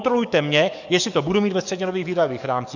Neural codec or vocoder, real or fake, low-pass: codec, 44.1 kHz, 7.8 kbps, DAC; fake; 7.2 kHz